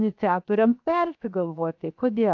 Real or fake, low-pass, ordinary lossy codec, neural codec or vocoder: fake; 7.2 kHz; AAC, 48 kbps; codec, 16 kHz, 0.7 kbps, FocalCodec